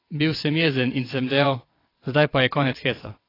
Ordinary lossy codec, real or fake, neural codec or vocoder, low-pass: AAC, 24 kbps; fake; vocoder, 22.05 kHz, 80 mel bands, WaveNeXt; 5.4 kHz